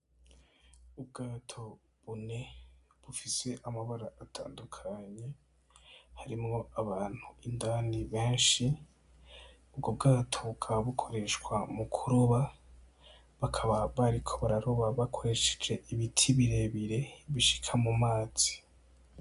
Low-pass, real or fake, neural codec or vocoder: 10.8 kHz; real; none